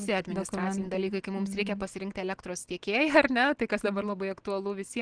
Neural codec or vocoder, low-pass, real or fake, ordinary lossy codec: none; 9.9 kHz; real; Opus, 16 kbps